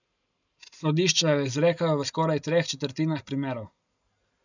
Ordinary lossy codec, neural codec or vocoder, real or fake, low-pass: none; none; real; 7.2 kHz